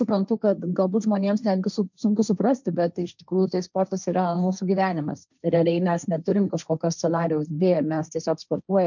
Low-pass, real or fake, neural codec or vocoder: 7.2 kHz; fake; codec, 16 kHz, 1.1 kbps, Voila-Tokenizer